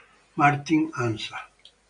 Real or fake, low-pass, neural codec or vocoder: real; 9.9 kHz; none